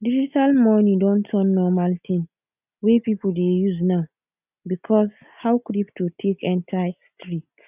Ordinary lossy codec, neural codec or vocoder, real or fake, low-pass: none; none; real; 3.6 kHz